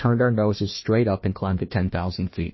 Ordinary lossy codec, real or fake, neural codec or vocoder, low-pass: MP3, 24 kbps; fake; codec, 16 kHz, 1 kbps, FunCodec, trained on Chinese and English, 50 frames a second; 7.2 kHz